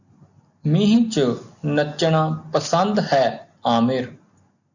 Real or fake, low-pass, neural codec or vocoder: real; 7.2 kHz; none